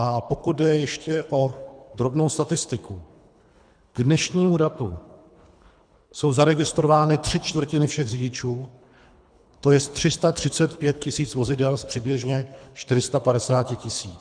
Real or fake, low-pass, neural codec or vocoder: fake; 9.9 kHz; codec, 24 kHz, 3 kbps, HILCodec